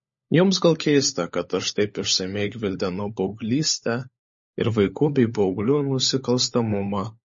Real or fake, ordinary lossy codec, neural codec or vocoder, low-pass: fake; MP3, 32 kbps; codec, 16 kHz, 16 kbps, FunCodec, trained on LibriTTS, 50 frames a second; 7.2 kHz